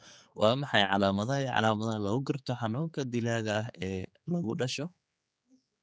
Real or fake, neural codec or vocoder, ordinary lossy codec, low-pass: fake; codec, 16 kHz, 4 kbps, X-Codec, HuBERT features, trained on general audio; none; none